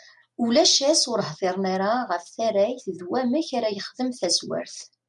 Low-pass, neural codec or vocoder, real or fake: 10.8 kHz; none; real